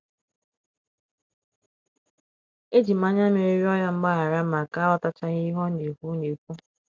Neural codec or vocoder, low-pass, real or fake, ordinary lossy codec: none; none; real; none